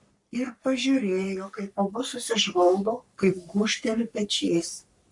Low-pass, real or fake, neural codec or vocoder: 10.8 kHz; fake; codec, 44.1 kHz, 3.4 kbps, Pupu-Codec